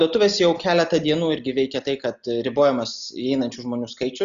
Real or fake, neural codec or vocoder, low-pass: real; none; 7.2 kHz